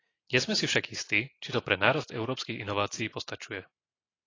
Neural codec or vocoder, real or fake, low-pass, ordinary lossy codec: none; real; 7.2 kHz; AAC, 32 kbps